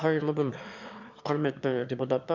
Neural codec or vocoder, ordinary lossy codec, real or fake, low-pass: autoencoder, 22.05 kHz, a latent of 192 numbers a frame, VITS, trained on one speaker; none; fake; 7.2 kHz